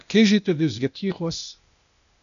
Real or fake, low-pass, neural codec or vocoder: fake; 7.2 kHz; codec, 16 kHz, 0.8 kbps, ZipCodec